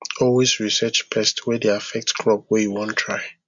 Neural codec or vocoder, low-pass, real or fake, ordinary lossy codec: none; 7.2 kHz; real; MP3, 64 kbps